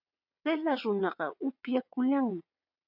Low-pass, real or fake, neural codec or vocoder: 5.4 kHz; fake; vocoder, 22.05 kHz, 80 mel bands, WaveNeXt